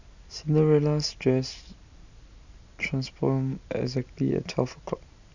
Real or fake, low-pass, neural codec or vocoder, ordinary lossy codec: real; 7.2 kHz; none; none